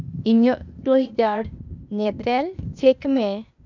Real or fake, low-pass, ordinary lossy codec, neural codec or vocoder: fake; 7.2 kHz; none; codec, 16 kHz, 0.8 kbps, ZipCodec